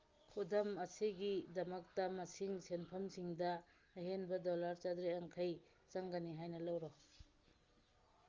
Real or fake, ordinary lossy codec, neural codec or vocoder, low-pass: real; Opus, 32 kbps; none; 7.2 kHz